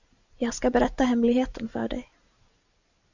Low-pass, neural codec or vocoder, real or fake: 7.2 kHz; none; real